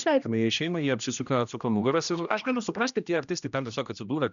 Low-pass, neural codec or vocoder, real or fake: 7.2 kHz; codec, 16 kHz, 1 kbps, X-Codec, HuBERT features, trained on general audio; fake